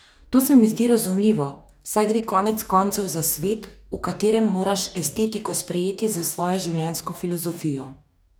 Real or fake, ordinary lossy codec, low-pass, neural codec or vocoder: fake; none; none; codec, 44.1 kHz, 2.6 kbps, DAC